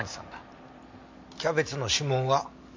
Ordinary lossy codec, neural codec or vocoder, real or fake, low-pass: MP3, 48 kbps; none; real; 7.2 kHz